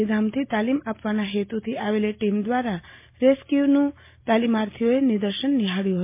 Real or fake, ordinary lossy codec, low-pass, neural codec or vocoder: real; MP3, 24 kbps; 3.6 kHz; none